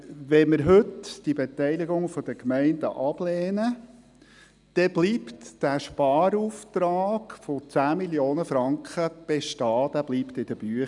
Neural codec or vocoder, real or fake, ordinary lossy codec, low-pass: none; real; none; 10.8 kHz